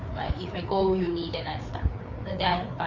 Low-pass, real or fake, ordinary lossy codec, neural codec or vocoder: 7.2 kHz; fake; MP3, 48 kbps; codec, 16 kHz, 4 kbps, FreqCodec, larger model